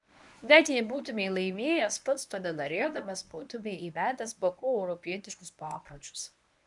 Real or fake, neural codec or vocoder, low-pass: fake; codec, 24 kHz, 0.9 kbps, WavTokenizer, medium speech release version 1; 10.8 kHz